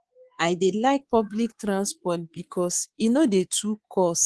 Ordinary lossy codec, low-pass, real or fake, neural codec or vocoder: Opus, 16 kbps; 10.8 kHz; fake; codec, 24 kHz, 3.1 kbps, DualCodec